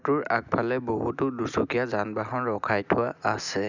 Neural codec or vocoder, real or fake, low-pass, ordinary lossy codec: none; real; 7.2 kHz; none